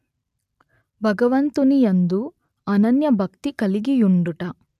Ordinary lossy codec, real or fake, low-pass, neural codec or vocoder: none; real; 14.4 kHz; none